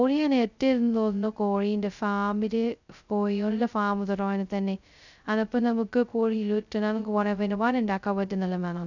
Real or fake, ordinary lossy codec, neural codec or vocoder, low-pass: fake; none; codec, 16 kHz, 0.2 kbps, FocalCodec; 7.2 kHz